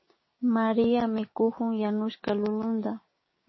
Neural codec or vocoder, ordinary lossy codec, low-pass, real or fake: codec, 16 kHz, 6 kbps, DAC; MP3, 24 kbps; 7.2 kHz; fake